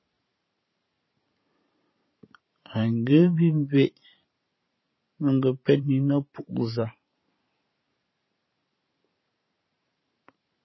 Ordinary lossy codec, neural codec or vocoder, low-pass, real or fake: MP3, 24 kbps; vocoder, 44.1 kHz, 128 mel bands every 512 samples, BigVGAN v2; 7.2 kHz; fake